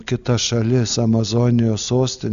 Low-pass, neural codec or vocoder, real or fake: 7.2 kHz; none; real